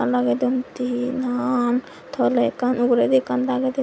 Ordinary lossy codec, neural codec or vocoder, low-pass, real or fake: none; none; none; real